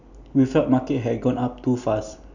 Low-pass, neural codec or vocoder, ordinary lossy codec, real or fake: 7.2 kHz; none; none; real